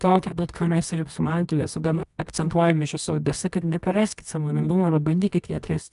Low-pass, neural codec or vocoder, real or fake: 10.8 kHz; codec, 24 kHz, 0.9 kbps, WavTokenizer, medium music audio release; fake